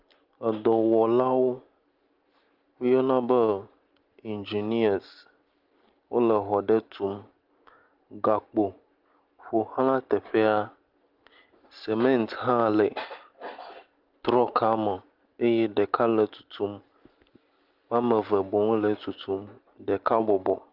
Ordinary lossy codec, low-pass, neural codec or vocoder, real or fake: Opus, 24 kbps; 5.4 kHz; none; real